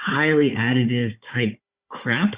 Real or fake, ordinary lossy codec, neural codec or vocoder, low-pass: fake; Opus, 64 kbps; codec, 16 kHz, 4 kbps, FunCodec, trained on Chinese and English, 50 frames a second; 3.6 kHz